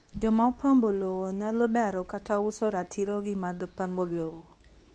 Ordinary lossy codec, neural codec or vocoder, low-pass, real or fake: none; codec, 24 kHz, 0.9 kbps, WavTokenizer, medium speech release version 2; none; fake